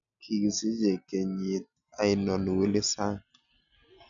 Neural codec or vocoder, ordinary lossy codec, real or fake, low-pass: none; none; real; 7.2 kHz